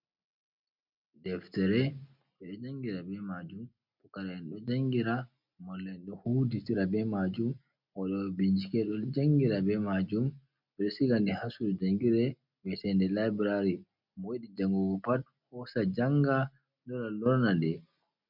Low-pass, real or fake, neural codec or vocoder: 5.4 kHz; real; none